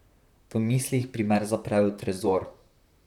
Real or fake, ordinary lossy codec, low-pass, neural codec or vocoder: fake; none; 19.8 kHz; vocoder, 44.1 kHz, 128 mel bands, Pupu-Vocoder